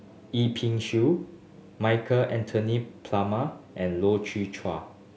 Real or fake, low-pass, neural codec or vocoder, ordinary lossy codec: real; none; none; none